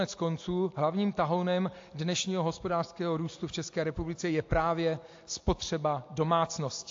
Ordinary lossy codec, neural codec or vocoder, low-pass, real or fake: AAC, 48 kbps; none; 7.2 kHz; real